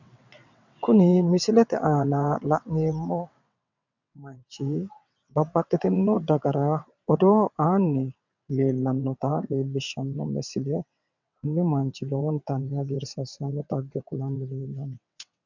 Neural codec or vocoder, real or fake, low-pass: vocoder, 22.05 kHz, 80 mel bands, WaveNeXt; fake; 7.2 kHz